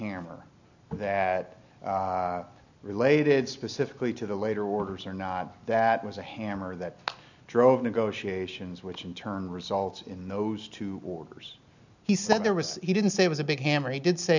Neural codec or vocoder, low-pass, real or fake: none; 7.2 kHz; real